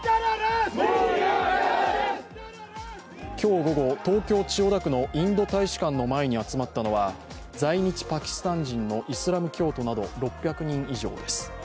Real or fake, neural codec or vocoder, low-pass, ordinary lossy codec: real; none; none; none